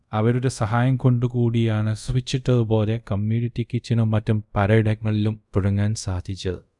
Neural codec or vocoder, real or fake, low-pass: codec, 24 kHz, 0.5 kbps, DualCodec; fake; 10.8 kHz